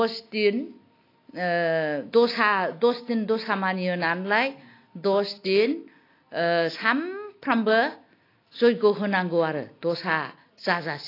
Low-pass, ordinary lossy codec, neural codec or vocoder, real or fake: 5.4 kHz; AAC, 32 kbps; none; real